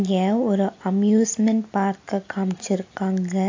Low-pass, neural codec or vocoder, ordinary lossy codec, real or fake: 7.2 kHz; none; AAC, 48 kbps; real